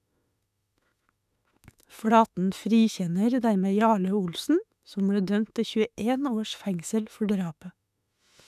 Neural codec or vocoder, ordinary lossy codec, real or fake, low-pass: autoencoder, 48 kHz, 32 numbers a frame, DAC-VAE, trained on Japanese speech; none; fake; 14.4 kHz